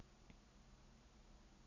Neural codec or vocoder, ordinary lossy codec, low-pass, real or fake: none; none; 7.2 kHz; real